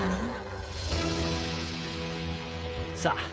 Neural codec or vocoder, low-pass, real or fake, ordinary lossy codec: codec, 16 kHz, 16 kbps, FreqCodec, smaller model; none; fake; none